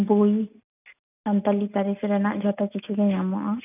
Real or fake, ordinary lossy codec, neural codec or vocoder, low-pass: real; none; none; 3.6 kHz